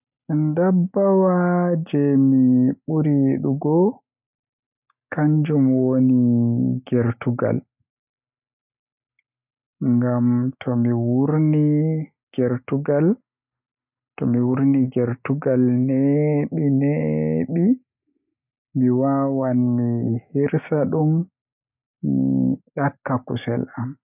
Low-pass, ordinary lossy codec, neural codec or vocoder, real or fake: 3.6 kHz; none; none; real